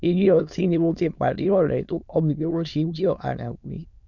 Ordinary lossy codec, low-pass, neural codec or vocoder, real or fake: none; 7.2 kHz; autoencoder, 22.05 kHz, a latent of 192 numbers a frame, VITS, trained on many speakers; fake